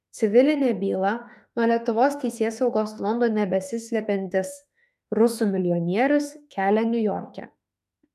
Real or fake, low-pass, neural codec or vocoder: fake; 14.4 kHz; autoencoder, 48 kHz, 32 numbers a frame, DAC-VAE, trained on Japanese speech